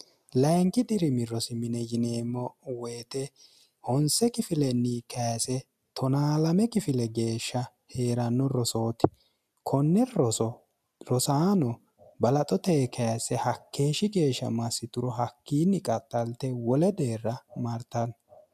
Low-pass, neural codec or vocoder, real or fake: 14.4 kHz; none; real